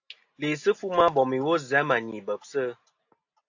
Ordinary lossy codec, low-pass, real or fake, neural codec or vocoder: AAC, 48 kbps; 7.2 kHz; real; none